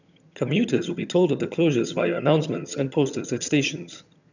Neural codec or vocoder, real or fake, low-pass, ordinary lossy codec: vocoder, 22.05 kHz, 80 mel bands, HiFi-GAN; fake; 7.2 kHz; none